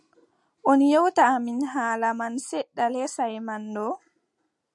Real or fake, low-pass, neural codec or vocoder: real; 10.8 kHz; none